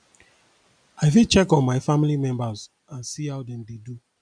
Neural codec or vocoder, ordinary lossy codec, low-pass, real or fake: none; none; 9.9 kHz; real